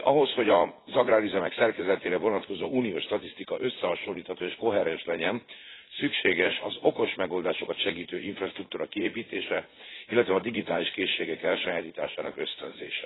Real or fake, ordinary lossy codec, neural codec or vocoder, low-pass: fake; AAC, 16 kbps; vocoder, 44.1 kHz, 80 mel bands, Vocos; 7.2 kHz